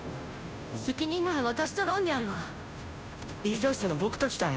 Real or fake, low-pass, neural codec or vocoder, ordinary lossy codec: fake; none; codec, 16 kHz, 0.5 kbps, FunCodec, trained on Chinese and English, 25 frames a second; none